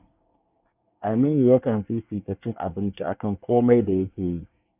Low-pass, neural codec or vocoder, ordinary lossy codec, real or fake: 3.6 kHz; codec, 24 kHz, 1 kbps, SNAC; none; fake